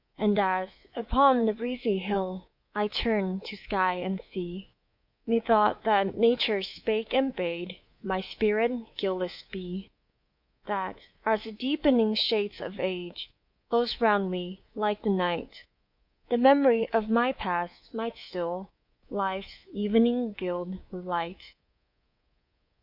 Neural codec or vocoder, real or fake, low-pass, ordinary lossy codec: codec, 24 kHz, 3.1 kbps, DualCodec; fake; 5.4 kHz; Opus, 64 kbps